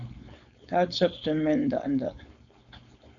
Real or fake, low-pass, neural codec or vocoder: fake; 7.2 kHz; codec, 16 kHz, 4.8 kbps, FACodec